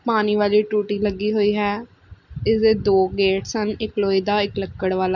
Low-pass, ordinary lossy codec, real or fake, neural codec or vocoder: 7.2 kHz; none; real; none